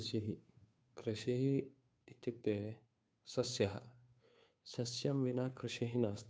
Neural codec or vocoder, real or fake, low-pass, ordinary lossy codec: codec, 16 kHz, 2 kbps, FunCodec, trained on Chinese and English, 25 frames a second; fake; none; none